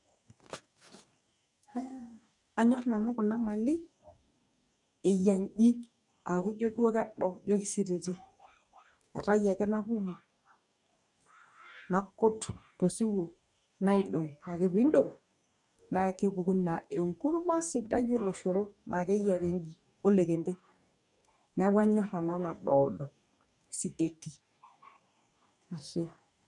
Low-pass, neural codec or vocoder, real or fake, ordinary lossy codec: 10.8 kHz; codec, 44.1 kHz, 2.6 kbps, DAC; fake; MP3, 96 kbps